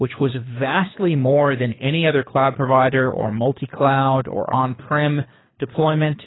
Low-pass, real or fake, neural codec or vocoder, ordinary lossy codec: 7.2 kHz; fake; codec, 24 kHz, 3 kbps, HILCodec; AAC, 16 kbps